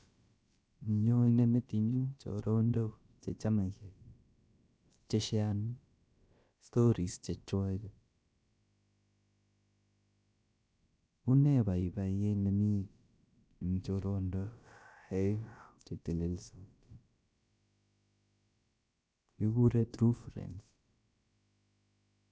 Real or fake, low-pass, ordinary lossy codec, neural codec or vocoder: fake; none; none; codec, 16 kHz, about 1 kbps, DyCAST, with the encoder's durations